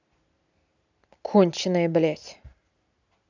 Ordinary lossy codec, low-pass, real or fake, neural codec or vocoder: none; 7.2 kHz; real; none